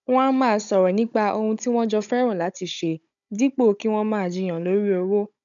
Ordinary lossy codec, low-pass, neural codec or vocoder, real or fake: none; 7.2 kHz; codec, 16 kHz, 16 kbps, FunCodec, trained on Chinese and English, 50 frames a second; fake